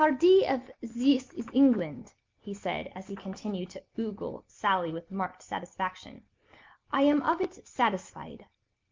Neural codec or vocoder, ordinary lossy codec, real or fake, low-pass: none; Opus, 24 kbps; real; 7.2 kHz